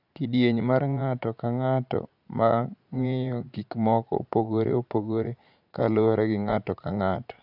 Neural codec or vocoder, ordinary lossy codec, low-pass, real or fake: vocoder, 44.1 kHz, 80 mel bands, Vocos; AAC, 48 kbps; 5.4 kHz; fake